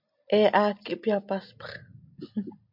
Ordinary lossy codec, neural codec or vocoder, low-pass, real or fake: MP3, 48 kbps; none; 5.4 kHz; real